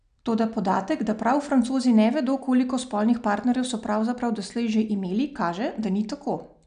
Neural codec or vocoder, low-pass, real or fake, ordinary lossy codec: none; 9.9 kHz; real; none